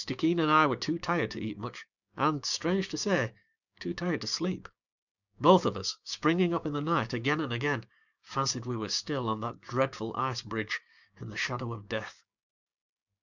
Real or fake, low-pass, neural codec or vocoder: fake; 7.2 kHz; codec, 16 kHz, 6 kbps, DAC